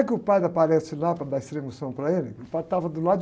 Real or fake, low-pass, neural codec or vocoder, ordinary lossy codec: real; none; none; none